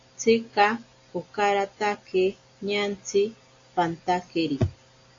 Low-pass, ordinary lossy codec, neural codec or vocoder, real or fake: 7.2 kHz; AAC, 48 kbps; none; real